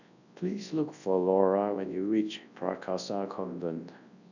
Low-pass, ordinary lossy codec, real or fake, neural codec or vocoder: 7.2 kHz; none; fake; codec, 24 kHz, 0.9 kbps, WavTokenizer, large speech release